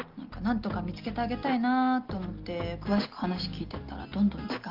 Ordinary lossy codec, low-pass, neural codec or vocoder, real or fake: Opus, 32 kbps; 5.4 kHz; none; real